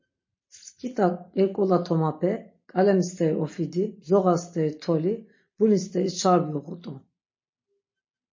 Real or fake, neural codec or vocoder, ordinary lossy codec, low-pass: real; none; MP3, 32 kbps; 7.2 kHz